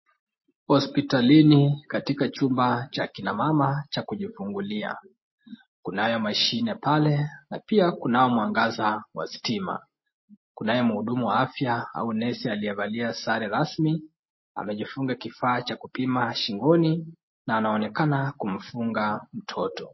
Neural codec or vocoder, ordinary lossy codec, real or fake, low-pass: none; MP3, 24 kbps; real; 7.2 kHz